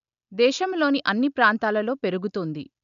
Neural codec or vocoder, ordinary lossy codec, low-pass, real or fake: none; AAC, 96 kbps; 7.2 kHz; real